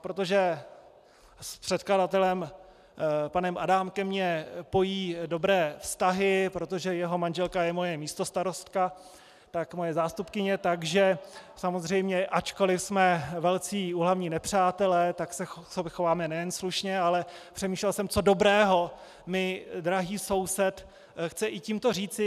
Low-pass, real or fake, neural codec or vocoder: 14.4 kHz; real; none